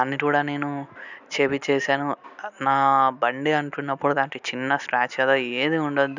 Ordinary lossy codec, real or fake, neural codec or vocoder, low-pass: none; real; none; 7.2 kHz